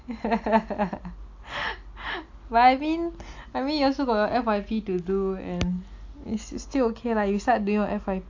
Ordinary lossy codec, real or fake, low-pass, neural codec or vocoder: AAC, 48 kbps; real; 7.2 kHz; none